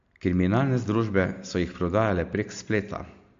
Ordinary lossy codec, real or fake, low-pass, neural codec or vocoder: MP3, 48 kbps; real; 7.2 kHz; none